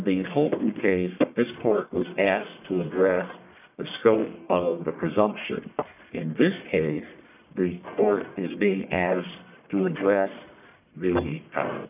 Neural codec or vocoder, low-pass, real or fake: codec, 44.1 kHz, 1.7 kbps, Pupu-Codec; 3.6 kHz; fake